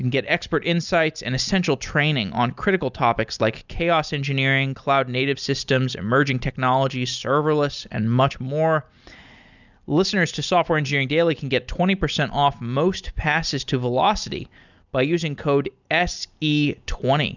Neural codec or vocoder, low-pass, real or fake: none; 7.2 kHz; real